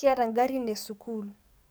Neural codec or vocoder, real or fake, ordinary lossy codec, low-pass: codec, 44.1 kHz, 7.8 kbps, DAC; fake; none; none